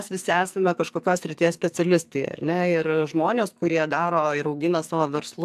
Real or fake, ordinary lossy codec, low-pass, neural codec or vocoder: fake; AAC, 96 kbps; 14.4 kHz; codec, 44.1 kHz, 2.6 kbps, SNAC